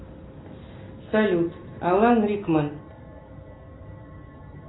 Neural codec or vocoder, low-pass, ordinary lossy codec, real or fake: none; 7.2 kHz; AAC, 16 kbps; real